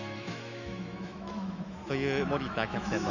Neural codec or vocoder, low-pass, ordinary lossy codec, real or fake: autoencoder, 48 kHz, 128 numbers a frame, DAC-VAE, trained on Japanese speech; 7.2 kHz; none; fake